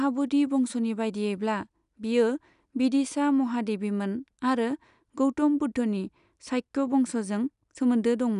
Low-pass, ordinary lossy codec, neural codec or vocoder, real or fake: 10.8 kHz; none; none; real